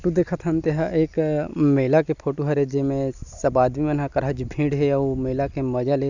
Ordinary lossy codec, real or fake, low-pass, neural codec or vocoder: none; real; 7.2 kHz; none